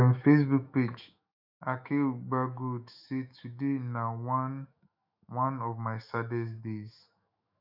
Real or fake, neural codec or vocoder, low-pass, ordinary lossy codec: fake; autoencoder, 48 kHz, 128 numbers a frame, DAC-VAE, trained on Japanese speech; 5.4 kHz; none